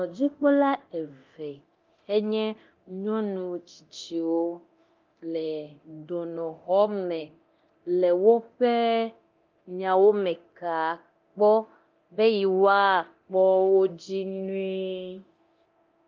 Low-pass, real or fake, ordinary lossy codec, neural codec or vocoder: 7.2 kHz; fake; Opus, 32 kbps; codec, 24 kHz, 0.9 kbps, DualCodec